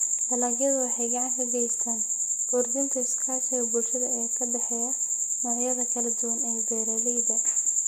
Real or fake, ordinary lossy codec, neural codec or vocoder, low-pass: real; none; none; none